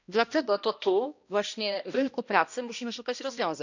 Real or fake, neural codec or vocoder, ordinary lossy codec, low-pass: fake; codec, 16 kHz, 1 kbps, X-Codec, HuBERT features, trained on balanced general audio; none; 7.2 kHz